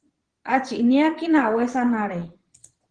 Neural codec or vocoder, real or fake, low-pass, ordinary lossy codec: vocoder, 22.05 kHz, 80 mel bands, Vocos; fake; 9.9 kHz; Opus, 16 kbps